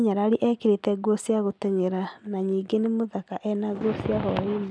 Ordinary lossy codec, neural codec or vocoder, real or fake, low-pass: none; none; real; 9.9 kHz